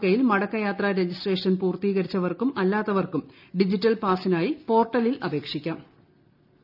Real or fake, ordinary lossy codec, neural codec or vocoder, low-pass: real; none; none; 5.4 kHz